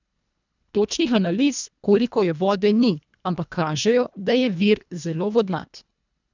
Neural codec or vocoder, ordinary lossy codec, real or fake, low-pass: codec, 24 kHz, 1.5 kbps, HILCodec; none; fake; 7.2 kHz